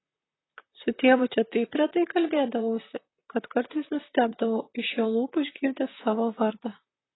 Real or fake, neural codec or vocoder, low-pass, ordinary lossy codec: fake; vocoder, 44.1 kHz, 128 mel bands, Pupu-Vocoder; 7.2 kHz; AAC, 16 kbps